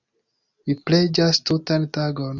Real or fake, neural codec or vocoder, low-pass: real; none; 7.2 kHz